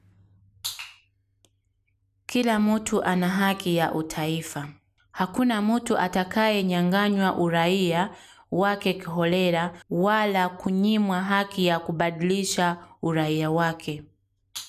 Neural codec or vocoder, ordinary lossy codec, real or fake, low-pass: none; none; real; 14.4 kHz